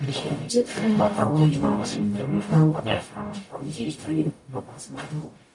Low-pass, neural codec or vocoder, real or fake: 10.8 kHz; codec, 44.1 kHz, 0.9 kbps, DAC; fake